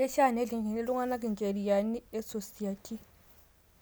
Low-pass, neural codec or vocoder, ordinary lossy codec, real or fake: none; none; none; real